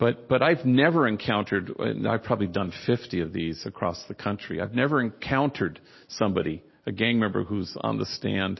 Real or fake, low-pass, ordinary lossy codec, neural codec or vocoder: real; 7.2 kHz; MP3, 24 kbps; none